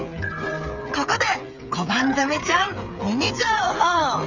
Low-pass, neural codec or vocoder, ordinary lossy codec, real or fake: 7.2 kHz; codec, 16 kHz, 8 kbps, FreqCodec, smaller model; none; fake